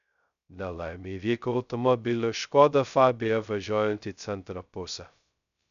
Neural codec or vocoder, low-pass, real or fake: codec, 16 kHz, 0.2 kbps, FocalCodec; 7.2 kHz; fake